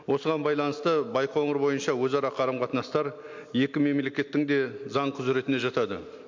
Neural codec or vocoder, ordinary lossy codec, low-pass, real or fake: none; MP3, 48 kbps; 7.2 kHz; real